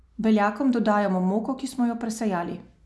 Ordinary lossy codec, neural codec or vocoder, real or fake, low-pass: none; none; real; none